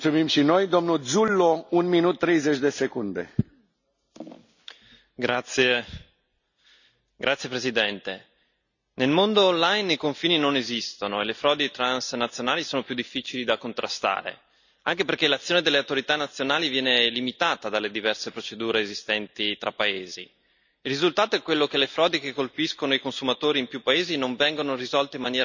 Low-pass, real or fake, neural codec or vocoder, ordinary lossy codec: 7.2 kHz; real; none; none